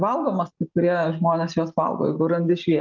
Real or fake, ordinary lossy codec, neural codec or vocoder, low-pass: real; Opus, 32 kbps; none; 7.2 kHz